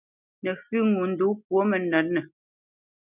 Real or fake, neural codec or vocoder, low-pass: real; none; 3.6 kHz